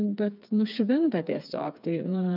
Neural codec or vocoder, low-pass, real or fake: codec, 16 kHz, 4 kbps, FreqCodec, smaller model; 5.4 kHz; fake